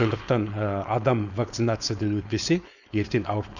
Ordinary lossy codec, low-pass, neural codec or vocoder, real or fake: none; 7.2 kHz; codec, 16 kHz, 4.8 kbps, FACodec; fake